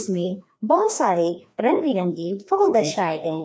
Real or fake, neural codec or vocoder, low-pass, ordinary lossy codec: fake; codec, 16 kHz, 1 kbps, FreqCodec, larger model; none; none